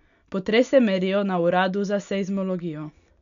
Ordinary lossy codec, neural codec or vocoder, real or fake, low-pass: none; none; real; 7.2 kHz